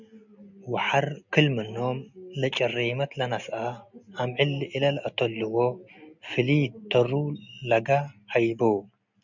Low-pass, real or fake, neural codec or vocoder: 7.2 kHz; real; none